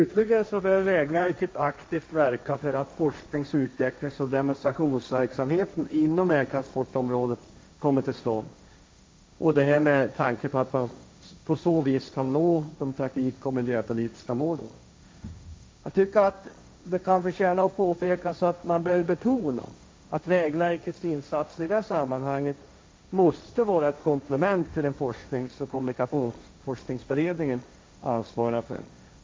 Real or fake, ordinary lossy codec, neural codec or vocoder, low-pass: fake; none; codec, 16 kHz, 1.1 kbps, Voila-Tokenizer; none